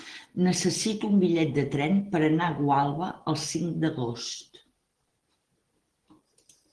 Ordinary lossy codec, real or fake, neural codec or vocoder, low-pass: Opus, 16 kbps; real; none; 10.8 kHz